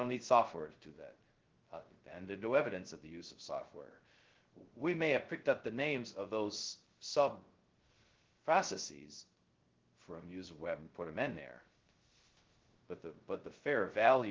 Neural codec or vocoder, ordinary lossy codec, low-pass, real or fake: codec, 16 kHz, 0.2 kbps, FocalCodec; Opus, 16 kbps; 7.2 kHz; fake